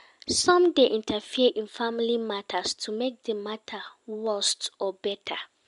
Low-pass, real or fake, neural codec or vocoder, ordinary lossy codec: 10.8 kHz; real; none; MP3, 64 kbps